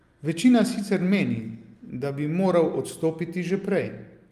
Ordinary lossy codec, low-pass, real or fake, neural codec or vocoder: Opus, 32 kbps; 14.4 kHz; real; none